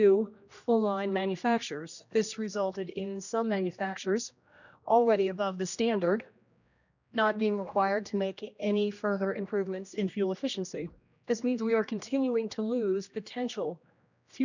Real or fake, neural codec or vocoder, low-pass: fake; codec, 16 kHz, 1 kbps, X-Codec, HuBERT features, trained on general audio; 7.2 kHz